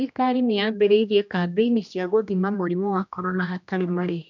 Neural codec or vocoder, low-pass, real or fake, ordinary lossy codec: codec, 16 kHz, 1 kbps, X-Codec, HuBERT features, trained on general audio; 7.2 kHz; fake; none